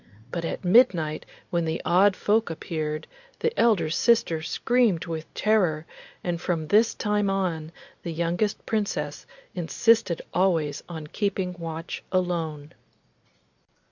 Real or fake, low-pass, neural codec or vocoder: real; 7.2 kHz; none